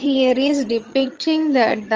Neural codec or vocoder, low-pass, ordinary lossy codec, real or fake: vocoder, 22.05 kHz, 80 mel bands, HiFi-GAN; 7.2 kHz; Opus, 24 kbps; fake